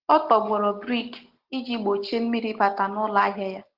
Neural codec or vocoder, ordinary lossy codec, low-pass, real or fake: none; Opus, 16 kbps; 5.4 kHz; real